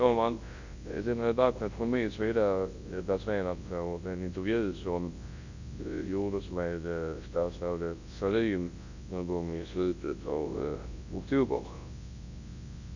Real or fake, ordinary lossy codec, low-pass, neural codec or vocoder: fake; Opus, 64 kbps; 7.2 kHz; codec, 24 kHz, 0.9 kbps, WavTokenizer, large speech release